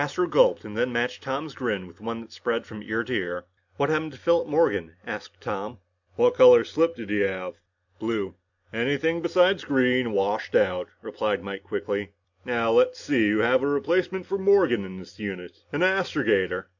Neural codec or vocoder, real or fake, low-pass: none; real; 7.2 kHz